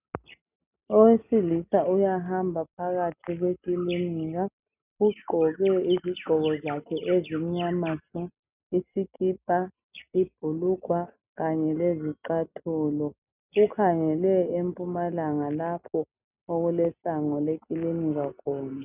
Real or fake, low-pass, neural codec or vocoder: real; 3.6 kHz; none